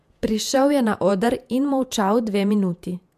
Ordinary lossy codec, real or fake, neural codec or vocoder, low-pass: MP3, 96 kbps; fake; vocoder, 48 kHz, 128 mel bands, Vocos; 14.4 kHz